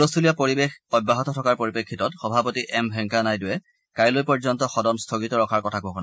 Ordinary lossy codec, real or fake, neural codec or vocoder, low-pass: none; real; none; none